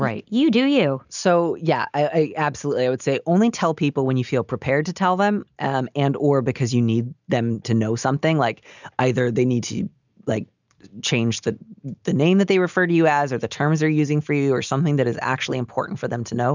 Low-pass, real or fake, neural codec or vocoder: 7.2 kHz; real; none